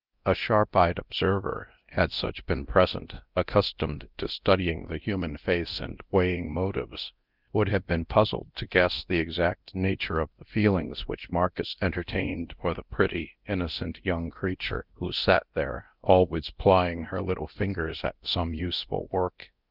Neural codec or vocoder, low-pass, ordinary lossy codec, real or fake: codec, 24 kHz, 0.9 kbps, DualCodec; 5.4 kHz; Opus, 24 kbps; fake